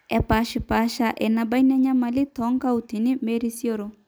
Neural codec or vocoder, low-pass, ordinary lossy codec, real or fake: none; none; none; real